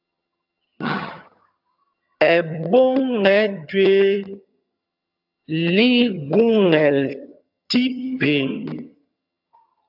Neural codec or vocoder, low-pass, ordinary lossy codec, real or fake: vocoder, 22.05 kHz, 80 mel bands, HiFi-GAN; 5.4 kHz; AAC, 48 kbps; fake